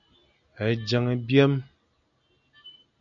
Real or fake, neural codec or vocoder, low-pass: real; none; 7.2 kHz